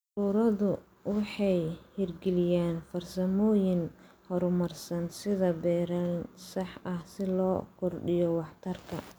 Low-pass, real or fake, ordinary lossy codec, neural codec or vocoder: none; real; none; none